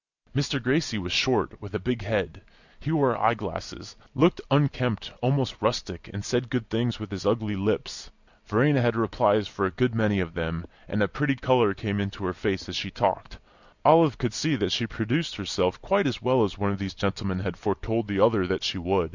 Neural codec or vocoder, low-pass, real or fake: none; 7.2 kHz; real